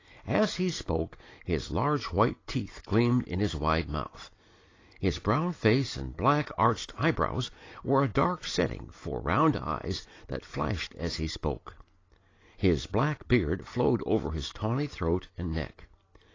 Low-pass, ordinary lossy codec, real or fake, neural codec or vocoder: 7.2 kHz; AAC, 32 kbps; real; none